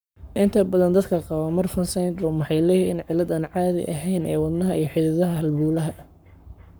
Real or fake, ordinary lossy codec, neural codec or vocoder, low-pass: fake; none; codec, 44.1 kHz, 7.8 kbps, Pupu-Codec; none